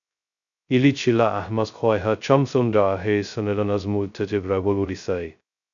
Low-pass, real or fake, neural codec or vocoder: 7.2 kHz; fake; codec, 16 kHz, 0.2 kbps, FocalCodec